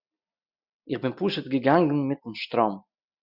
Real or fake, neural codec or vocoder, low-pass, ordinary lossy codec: real; none; 5.4 kHz; Opus, 64 kbps